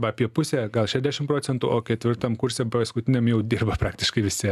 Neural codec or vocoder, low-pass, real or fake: none; 14.4 kHz; real